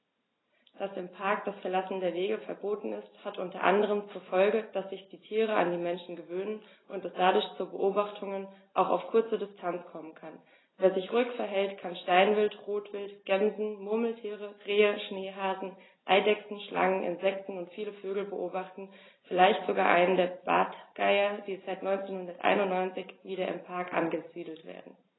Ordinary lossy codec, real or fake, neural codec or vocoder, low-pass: AAC, 16 kbps; real; none; 7.2 kHz